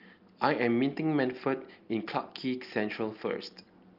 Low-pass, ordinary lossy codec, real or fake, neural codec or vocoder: 5.4 kHz; Opus, 32 kbps; real; none